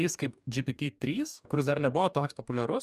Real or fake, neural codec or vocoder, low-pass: fake; codec, 44.1 kHz, 2.6 kbps, DAC; 14.4 kHz